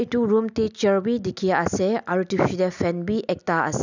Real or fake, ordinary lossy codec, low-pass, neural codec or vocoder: real; none; 7.2 kHz; none